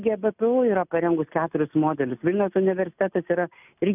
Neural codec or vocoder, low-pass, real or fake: none; 3.6 kHz; real